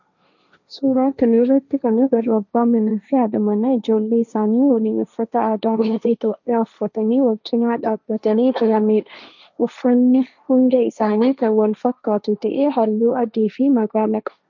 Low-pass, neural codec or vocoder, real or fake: 7.2 kHz; codec, 16 kHz, 1.1 kbps, Voila-Tokenizer; fake